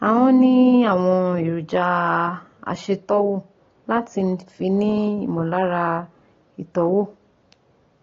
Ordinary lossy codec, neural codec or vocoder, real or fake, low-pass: AAC, 32 kbps; none; real; 7.2 kHz